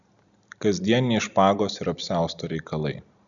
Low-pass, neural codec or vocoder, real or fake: 7.2 kHz; none; real